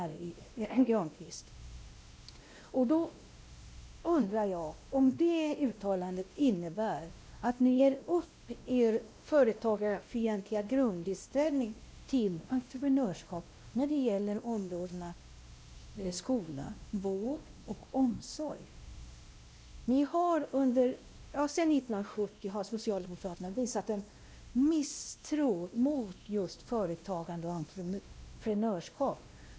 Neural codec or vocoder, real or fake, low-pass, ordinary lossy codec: codec, 16 kHz, 1 kbps, X-Codec, WavLM features, trained on Multilingual LibriSpeech; fake; none; none